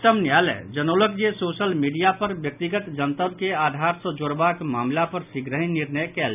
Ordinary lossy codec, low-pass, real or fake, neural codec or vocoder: none; 3.6 kHz; real; none